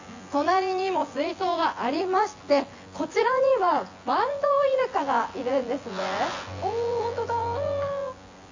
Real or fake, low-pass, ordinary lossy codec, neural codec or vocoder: fake; 7.2 kHz; AAC, 48 kbps; vocoder, 24 kHz, 100 mel bands, Vocos